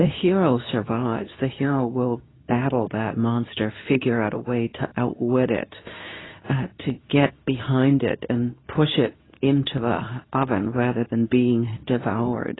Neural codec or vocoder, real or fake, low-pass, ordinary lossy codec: codec, 24 kHz, 0.9 kbps, WavTokenizer, medium speech release version 2; fake; 7.2 kHz; AAC, 16 kbps